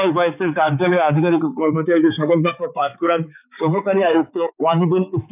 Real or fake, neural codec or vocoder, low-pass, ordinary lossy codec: fake; codec, 16 kHz, 4 kbps, X-Codec, HuBERT features, trained on balanced general audio; 3.6 kHz; none